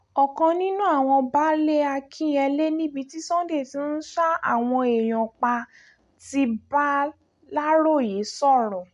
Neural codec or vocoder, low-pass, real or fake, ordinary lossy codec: none; 10.8 kHz; real; MP3, 64 kbps